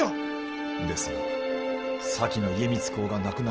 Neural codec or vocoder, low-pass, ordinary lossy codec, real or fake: none; 7.2 kHz; Opus, 16 kbps; real